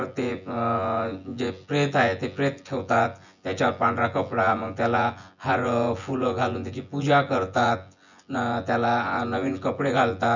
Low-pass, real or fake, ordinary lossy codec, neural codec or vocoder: 7.2 kHz; fake; none; vocoder, 24 kHz, 100 mel bands, Vocos